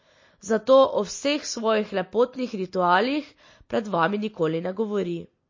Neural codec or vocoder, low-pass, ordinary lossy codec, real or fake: none; 7.2 kHz; MP3, 32 kbps; real